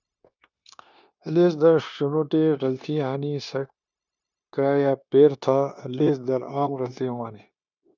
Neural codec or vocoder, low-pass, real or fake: codec, 16 kHz, 0.9 kbps, LongCat-Audio-Codec; 7.2 kHz; fake